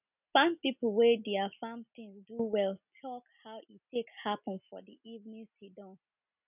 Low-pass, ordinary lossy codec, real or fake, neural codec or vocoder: 3.6 kHz; none; real; none